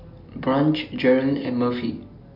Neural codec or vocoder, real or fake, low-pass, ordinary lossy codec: none; real; 5.4 kHz; none